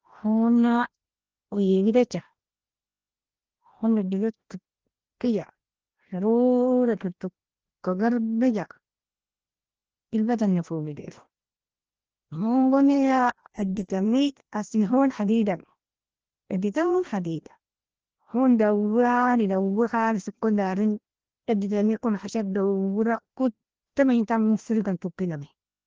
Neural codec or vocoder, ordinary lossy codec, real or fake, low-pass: codec, 16 kHz, 1 kbps, FreqCodec, larger model; Opus, 16 kbps; fake; 7.2 kHz